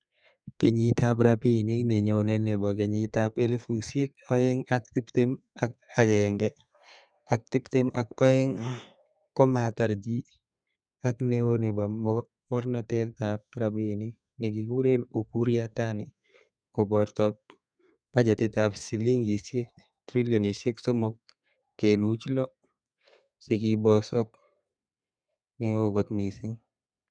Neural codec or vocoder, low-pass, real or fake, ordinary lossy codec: codec, 32 kHz, 1.9 kbps, SNAC; 9.9 kHz; fake; none